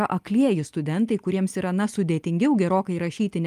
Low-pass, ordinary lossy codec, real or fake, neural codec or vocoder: 14.4 kHz; Opus, 32 kbps; real; none